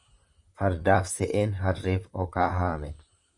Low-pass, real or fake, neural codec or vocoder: 10.8 kHz; fake; vocoder, 44.1 kHz, 128 mel bands, Pupu-Vocoder